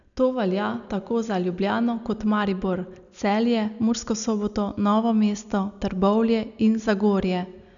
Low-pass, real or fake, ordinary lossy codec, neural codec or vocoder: 7.2 kHz; real; none; none